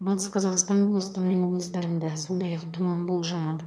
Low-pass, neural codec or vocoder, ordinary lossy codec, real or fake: none; autoencoder, 22.05 kHz, a latent of 192 numbers a frame, VITS, trained on one speaker; none; fake